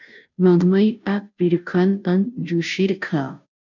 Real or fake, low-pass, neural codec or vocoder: fake; 7.2 kHz; codec, 16 kHz, 0.5 kbps, FunCodec, trained on Chinese and English, 25 frames a second